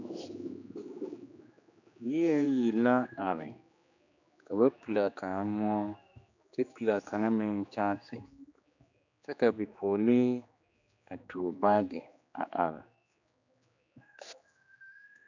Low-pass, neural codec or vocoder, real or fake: 7.2 kHz; codec, 16 kHz, 2 kbps, X-Codec, HuBERT features, trained on general audio; fake